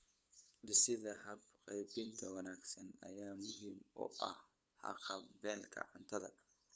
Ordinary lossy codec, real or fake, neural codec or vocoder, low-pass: none; fake; codec, 16 kHz, 8 kbps, FunCodec, trained on LibriTTS, 25 frames a second; none